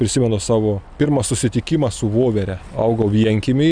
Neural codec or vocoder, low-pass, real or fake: none; 9.9 kHz; real